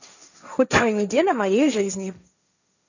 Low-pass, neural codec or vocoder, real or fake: 7.2 kHz; codec, 16 kHz, 1.1 kbps, Voila-Tokenizer; fake